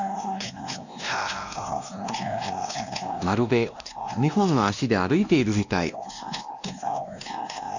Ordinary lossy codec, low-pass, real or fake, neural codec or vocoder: none; 7.2 kHz; fake; codec, 16 kHz, 1 kbps, X-Codec, WavLM features, trained on Multilingual LibriSpeech